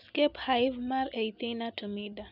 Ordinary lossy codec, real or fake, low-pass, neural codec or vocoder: none; real; 5.4 kHz; none